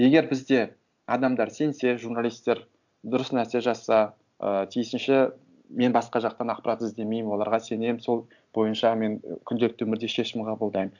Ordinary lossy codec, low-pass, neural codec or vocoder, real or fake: none; 7.2 kHz; none; real